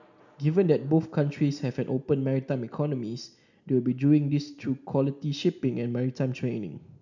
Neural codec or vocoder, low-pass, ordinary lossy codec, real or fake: none; 7.2 kHz; none; real